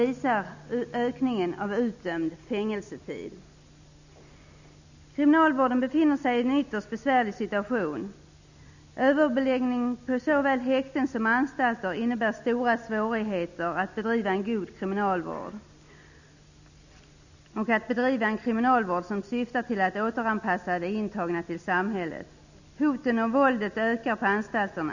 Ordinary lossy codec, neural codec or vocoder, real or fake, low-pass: none; none; real; 7.2 kHz